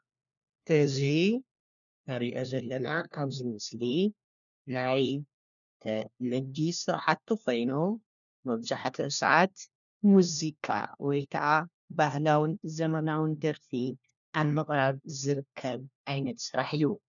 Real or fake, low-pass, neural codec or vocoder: fake; 7.2 kHz; codec, 16 kHz, 1 kbps, FunCodec, trained on LibriTTS, 50 frames a second